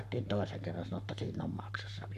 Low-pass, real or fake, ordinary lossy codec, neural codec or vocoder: 14.4 kHz; fake; none; codec, 44.1 kHz, 7.8 kbps, Pupu-Codec